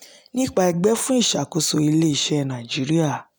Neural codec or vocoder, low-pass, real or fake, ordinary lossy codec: none; none; real; none